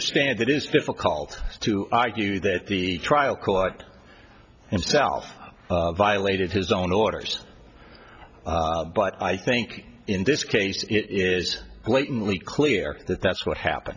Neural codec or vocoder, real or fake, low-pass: none; real; 7.2 kHz